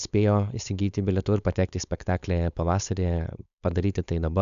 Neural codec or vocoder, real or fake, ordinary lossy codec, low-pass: codec, 16 kHz, 4.8 kbps, FACodec; fake; MP3, 96 kbps; 7.2 kHz